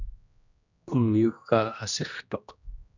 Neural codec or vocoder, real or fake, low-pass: codec, 16 kHz, 1 kbps, X-Codec, HuBERT features, trained on general audio; fake; 7.2 kHz